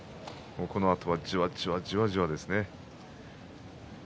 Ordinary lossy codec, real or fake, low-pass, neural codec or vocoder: none; real; none; none